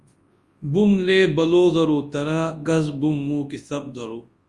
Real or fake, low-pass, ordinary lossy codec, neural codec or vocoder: fake; 10.8 kHz; Opus, 32 kbps; codec, 24 kHz, 0.9 kbps, WavTokenizer, large speech release